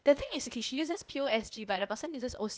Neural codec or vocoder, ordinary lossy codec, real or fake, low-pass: codec, 16 kHz, 0.8 kbps, ZipCodec; none; fake; none